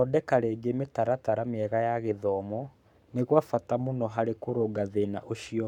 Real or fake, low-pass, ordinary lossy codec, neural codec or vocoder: fake; 19.8 kHz; none; codec, 44.1 kHz, 7.8 kbps, Pupu-Codec